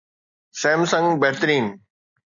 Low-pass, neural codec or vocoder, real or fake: 7.2 kHz; none; real